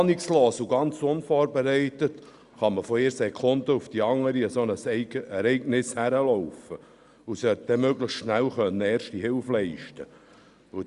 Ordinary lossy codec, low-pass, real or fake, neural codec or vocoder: Opus, 64 kbps; 10.8 kHz; real; none